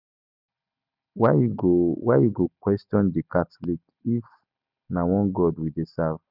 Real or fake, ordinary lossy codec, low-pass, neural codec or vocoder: real; none; 5.4 kHz; none